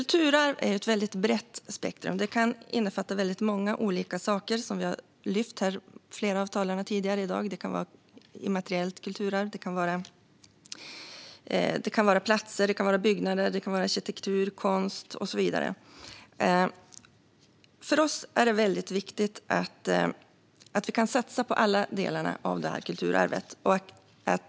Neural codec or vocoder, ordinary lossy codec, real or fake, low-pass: none; none; real; none